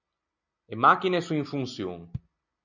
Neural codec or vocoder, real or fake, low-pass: none; real; 7.2 kHz